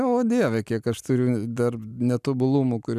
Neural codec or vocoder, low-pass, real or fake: none; 14.4 kHz; real